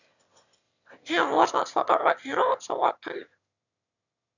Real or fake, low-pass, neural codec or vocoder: fake; 7.2 kHz; autoencoder, 22.05 kHz, a latent of 192 numbers a frame, VITS, trained on one speaker